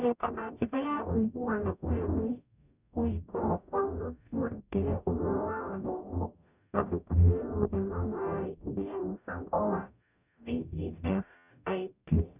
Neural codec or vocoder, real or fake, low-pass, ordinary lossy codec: codec, 44.1 kHz, 0.9 kbps, DAC; fake; 3.6 kHz; none